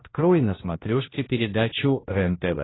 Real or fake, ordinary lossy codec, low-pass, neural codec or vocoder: fake; AAC, 16 kbps; 7.2 kHz; codec, 16 kHz, 1 kbps, X-Codec, HuBERT features, trained on general audio